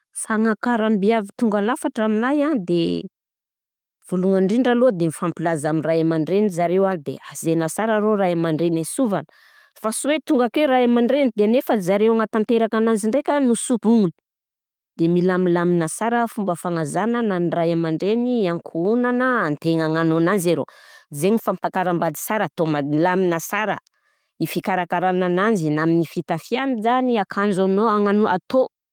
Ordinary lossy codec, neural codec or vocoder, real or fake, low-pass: Opus, 32 kbps; none; real; 19.8 kHz